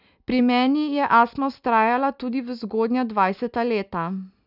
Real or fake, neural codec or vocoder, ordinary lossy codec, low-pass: real; none; none; 5.4 kHz